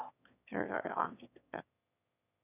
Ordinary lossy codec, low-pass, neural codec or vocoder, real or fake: none; 3.6 kHz; autoencoder, 22.05 kHz, a latent of 192 numbers a frame, VITS, trained on one speaker; fake